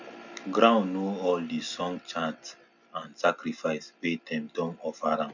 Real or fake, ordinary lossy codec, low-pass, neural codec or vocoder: real; none; 7.2 kHz; none